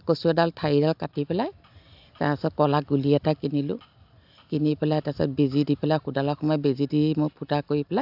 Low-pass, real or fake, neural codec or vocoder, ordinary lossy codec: 5.4 kHz; real; none; AAC, 48 kbps